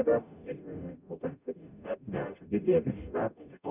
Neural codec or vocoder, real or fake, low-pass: codec, 44.1 kHz, 0.9 kbps, DAC; fake; 3.6 kHz